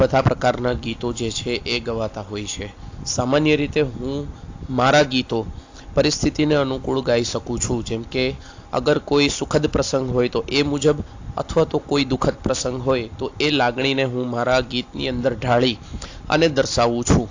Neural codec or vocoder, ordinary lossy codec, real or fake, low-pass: none; AAC, 48 kbps; real; 7.2 kHz